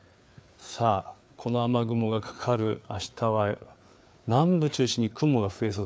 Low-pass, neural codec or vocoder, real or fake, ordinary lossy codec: none; codec, 16 kHz, 4 kbps, FreqCodec, larger model; fake; none